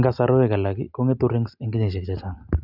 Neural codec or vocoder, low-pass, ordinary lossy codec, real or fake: none; 5.4 kHz; none; real